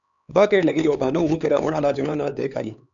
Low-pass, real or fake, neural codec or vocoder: 7.2 kHz; fake; codec, 16 kHz, 4 kbps, X-Codec, HuBERT features, trained on LibriSpeech